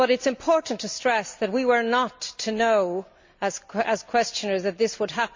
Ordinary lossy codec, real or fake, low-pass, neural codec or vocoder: MP3, 64 kbps; real; 7.2 kHz; none